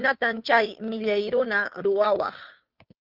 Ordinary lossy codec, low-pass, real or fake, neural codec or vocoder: Opus, 24 kbps; 5.4 kHz; fake; codec, 16 kHz, 2 kbps, FunCodec, trained on Chinese and English, 25 frames a second